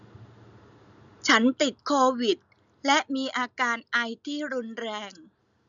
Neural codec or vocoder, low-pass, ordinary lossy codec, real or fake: none; 7.2 kHz; none; real